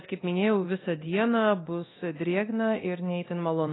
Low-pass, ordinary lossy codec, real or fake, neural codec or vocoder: 7.2 kHz; AAC, 16 kbps; fake; codec, 24 kHz, 0.9 kbps, DualCodec